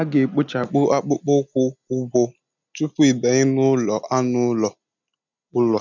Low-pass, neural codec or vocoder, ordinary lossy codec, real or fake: 7.2 kHz; none; none; real